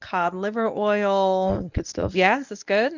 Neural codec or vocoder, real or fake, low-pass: codec, 24 kHz, 0.9 kbps, WavTokenizer, medium speech release version 1; fake; 7.2 kHz